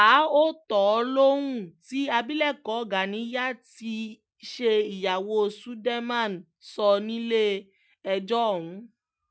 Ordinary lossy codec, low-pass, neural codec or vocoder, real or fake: none; none; none; real